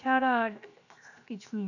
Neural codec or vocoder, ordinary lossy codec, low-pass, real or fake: codec, 16 kHz, 0.7 kbps, FocalCodec; none; 7.2 kHz; fake